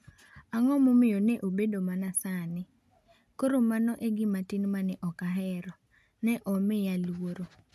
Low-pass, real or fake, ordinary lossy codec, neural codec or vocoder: 14.4 kHz; real; none; none